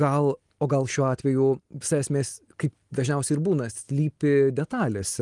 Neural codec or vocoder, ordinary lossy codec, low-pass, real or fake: none; Opus, 24 kbps; 10.8 kHz; real